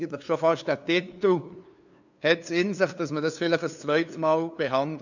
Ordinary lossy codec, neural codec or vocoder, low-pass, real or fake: none; codec, 16 kHz, 2 kbps, FunCodec, trained on LibriTTS, 25 frames a second; 7.2 kHz; fake